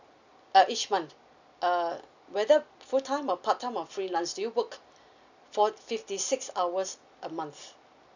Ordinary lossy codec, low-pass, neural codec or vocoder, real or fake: none; 7.2 kHz; none; real